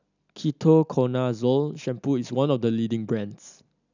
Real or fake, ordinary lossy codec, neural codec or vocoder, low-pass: real; none; none; 7.2 kHz